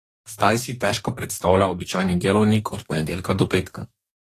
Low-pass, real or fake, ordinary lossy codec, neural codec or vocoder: 14.4 kHz; fake; AAC, 48 kbps; codec, 44.1 kHz, 2.6 kbps, DAC